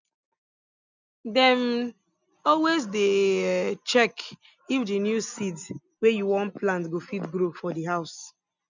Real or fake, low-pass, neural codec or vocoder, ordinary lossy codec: real; 7.2 kHz; none; none